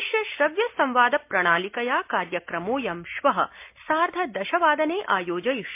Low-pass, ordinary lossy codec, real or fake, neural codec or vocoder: 3.6 kHz; MP3, 32 kbps; real; none